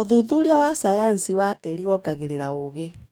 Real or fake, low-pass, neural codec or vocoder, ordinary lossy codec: fake; none; codec, 44.1 kHz, 2.6 kbps, DAC; none